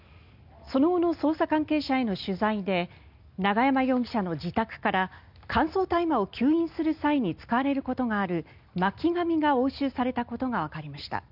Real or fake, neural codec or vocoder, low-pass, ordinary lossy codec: real; none; 5.4 kHz; none